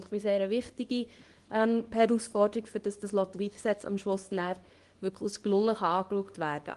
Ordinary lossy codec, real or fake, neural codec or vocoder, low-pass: Opus, 24 kbps; fake; codec, 24 kHz, 0.9 kbps, WavTokenizer, small release; 10.8 kHz